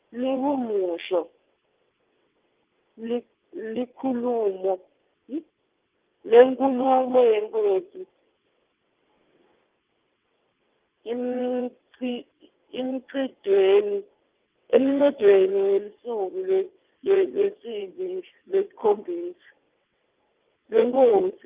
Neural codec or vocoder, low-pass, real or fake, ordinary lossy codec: vocoder, 22.05 kHz, 80 mel bands, WaveNeXt; 3.6 kHz; fake; Opus, 32 kbps